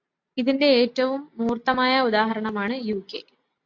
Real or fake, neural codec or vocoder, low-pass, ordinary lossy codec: real; none; 7.2 kHz; AAC, 48 kbps